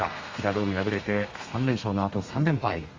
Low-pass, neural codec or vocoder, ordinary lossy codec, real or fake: 7.2 kHz; codec, 32 kHz, 1.9 kbps, SNAC; Opus, 32 kbps; fake